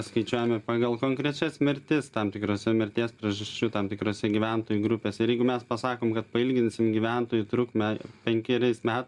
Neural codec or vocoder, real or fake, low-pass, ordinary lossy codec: none; real; 10.8 kHz; Opus, 64 kbps